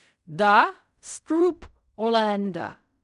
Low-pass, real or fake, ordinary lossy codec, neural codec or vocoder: 10.8 kHz; fake; none; codec, 16 kHz in and 24 kHz out, 0.4 kbps, LongCat-Audio-Codec, fine tuned four codebook decoder